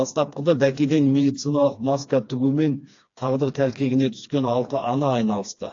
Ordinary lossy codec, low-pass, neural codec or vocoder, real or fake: AAC, 48 kbps; 7.2 kHz; codec, 16 kHz, 2 kbps, FreqCodec, smaller model; fake